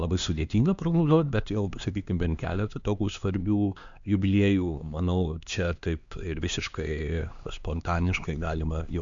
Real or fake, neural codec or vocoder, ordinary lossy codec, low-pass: fake; codec, 16 kHz, 2 kbps, X-Codec, HuBERT features, trained on LibriSpeech; Opus, 64 kbps; 7.2 kHz